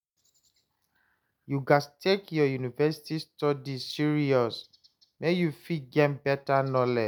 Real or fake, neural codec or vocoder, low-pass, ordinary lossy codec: real; none; none; none